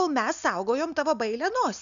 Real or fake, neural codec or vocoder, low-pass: real; none; 7.2 kHz